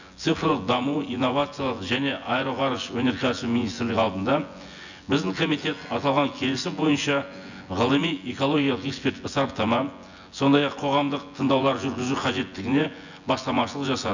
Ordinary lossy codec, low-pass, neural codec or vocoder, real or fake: none; 7.2 kHz; vocoder, 24 kHz, 100 mel bands, Vocos; fake